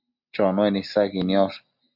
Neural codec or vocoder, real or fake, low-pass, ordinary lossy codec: none; real; 5.4 kHz; MP3, 48 kbps